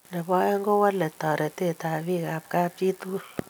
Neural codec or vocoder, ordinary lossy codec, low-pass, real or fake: none; none; none; real